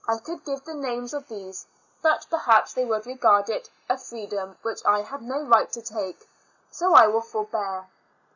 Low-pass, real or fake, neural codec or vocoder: 7.2 kHz; real; none